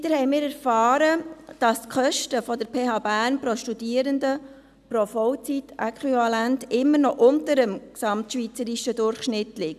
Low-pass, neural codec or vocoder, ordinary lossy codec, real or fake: 14.4 kHz; none; none; real